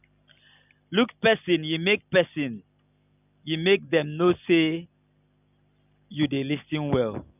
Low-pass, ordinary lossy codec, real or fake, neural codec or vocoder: 3.6 kHz; none; real; none